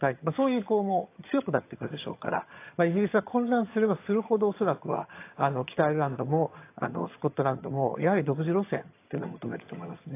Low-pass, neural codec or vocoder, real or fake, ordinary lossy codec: 3.6 kHz; vocoder, 22.05 kHz, 80 mel bands, HiFi-GAN; fake; AAC, 24 kbps